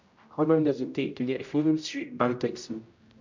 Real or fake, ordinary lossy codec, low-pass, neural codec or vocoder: fake; MP3, 64 kbps; 7.2 kHz; codec, 16 kHz, 0.5 kbps, X-Codec, HuBERT features, trained on general audio